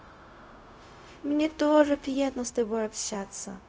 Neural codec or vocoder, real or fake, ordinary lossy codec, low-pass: codec, 16 kHz, 0.4 kbps, LongCat-Audio-Codec; fake; none; none